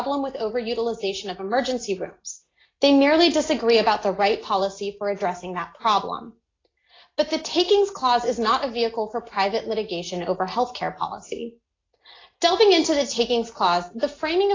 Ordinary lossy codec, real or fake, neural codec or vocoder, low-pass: AAC, 32 kbps; real; none; 7.2 kHz